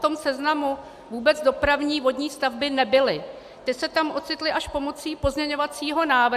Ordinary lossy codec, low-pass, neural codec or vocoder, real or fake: AAC, 96 kbps; 14.4 kHz; none; real